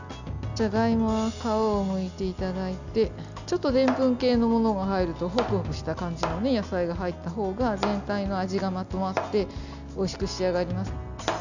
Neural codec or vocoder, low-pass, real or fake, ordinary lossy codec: none; 7.2 kHz; real; none